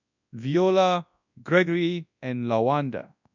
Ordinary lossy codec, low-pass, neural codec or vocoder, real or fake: none; 7.2 kHz; codec, 24 kHz, 0.9 kbps, WavTokenizer, large speech release; fake